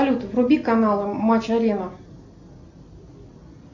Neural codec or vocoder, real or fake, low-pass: none; real; 7.2 kHz